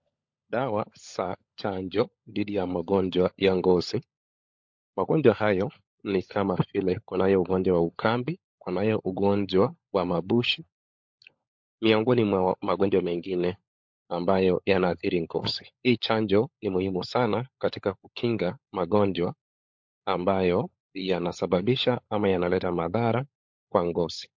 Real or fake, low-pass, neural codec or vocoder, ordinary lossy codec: fake; 7.2 kHz; codec, 16 kHz, 16 kbps, FunCodec, trained on LibriTTS, 50 frames a second; MP3, 48 kbps